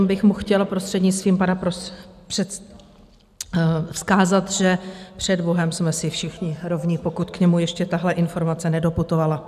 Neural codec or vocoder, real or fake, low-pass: none; real; 14.4 kHz